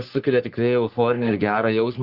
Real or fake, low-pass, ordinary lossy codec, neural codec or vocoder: fake; 5.4 kHz; Opus, 16 kbps; codec, 44.1 kHz, 3.4 kbps, Pupu-Codec